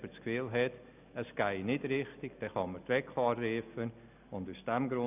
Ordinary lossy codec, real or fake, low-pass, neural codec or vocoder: none; real; 3.6 kHz; none